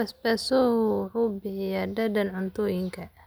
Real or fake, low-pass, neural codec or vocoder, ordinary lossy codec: real; none; none; none